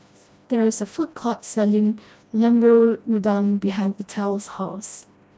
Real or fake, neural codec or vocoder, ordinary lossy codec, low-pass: fake; codec, 16 kHz, 1 kbps, FreqCodec, smaller model; none; none